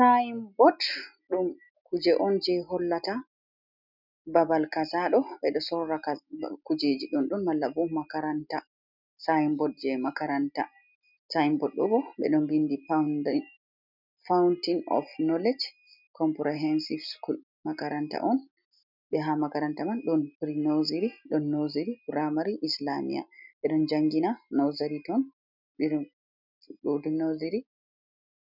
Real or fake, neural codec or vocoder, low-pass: real; none; 5.4 kHz